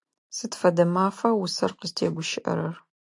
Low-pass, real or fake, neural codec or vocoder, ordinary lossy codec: 10.8 kHz; real; none; AAC, 64 kbps